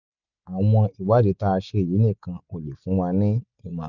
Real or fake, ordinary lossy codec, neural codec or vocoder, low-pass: real; none; none; 7.2 kHz